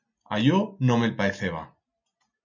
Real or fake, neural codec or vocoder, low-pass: real; none; 7.2 kHz